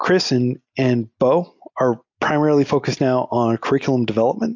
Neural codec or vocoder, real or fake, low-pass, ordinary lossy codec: none; real; 7.2 kHz; AAC, 48 kbps